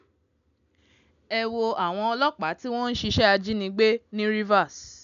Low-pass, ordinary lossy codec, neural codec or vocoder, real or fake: 7.2 kHz; none; none; real